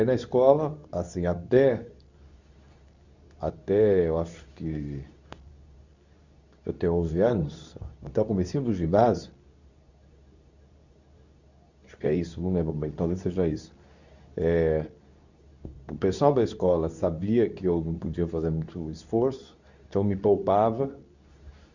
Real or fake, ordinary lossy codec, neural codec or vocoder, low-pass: fake; none; codec, 24 kHz, 0.9 kbps, WavTokenizer, medium speech release version 2; 7.2 kHz